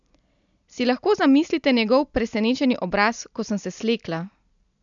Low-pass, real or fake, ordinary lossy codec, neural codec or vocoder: 7.2 kHz; real; none; none